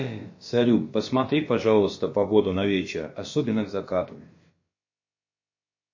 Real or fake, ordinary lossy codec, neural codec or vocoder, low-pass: fake; MP3, 32 kbps; codec, 16 kHz, about 1 kbps, DyCAST, with the encoder's durations; 7.2 kHz